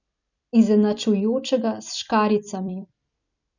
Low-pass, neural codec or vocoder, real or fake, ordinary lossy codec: 7.2 kHz; vocoder, 44.1 kHz, 128 mel bands every 256 samples, BigVGAN v2; fake; none